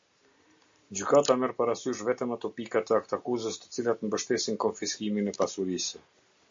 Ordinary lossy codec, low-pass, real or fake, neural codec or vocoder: MP3, 48 kbps; 7.2 kHz; real; none